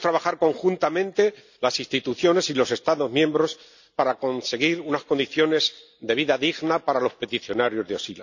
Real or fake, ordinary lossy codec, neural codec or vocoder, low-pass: real; none; none; 7.2 kHz